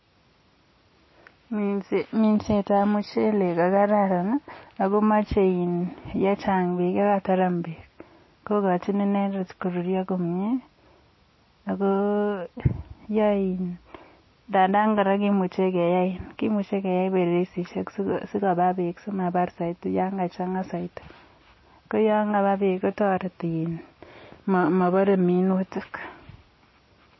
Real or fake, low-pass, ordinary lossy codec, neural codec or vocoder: real; 7.2 kHz; MP3, 24 kbps; none